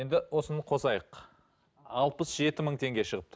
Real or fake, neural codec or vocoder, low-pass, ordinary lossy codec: real; none; none; none